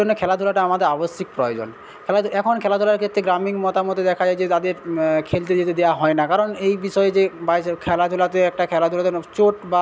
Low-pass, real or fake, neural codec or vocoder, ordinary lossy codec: none; real; none; none